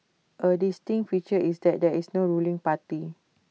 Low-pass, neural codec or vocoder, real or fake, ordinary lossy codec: none; none; real; none